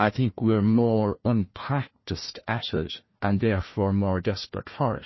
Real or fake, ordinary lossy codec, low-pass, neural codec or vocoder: fake; MP3, 24 kbps; 7.2 kHz; codec, 16 kHz, 1 kbps, FunCodec, trained on Chinese and English, 50 frames a second